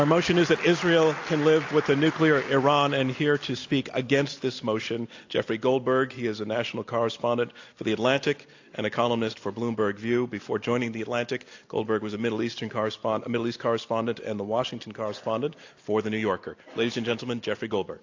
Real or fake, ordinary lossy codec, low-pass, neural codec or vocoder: real; AAC, 48 kbps; 7.2 kHz; none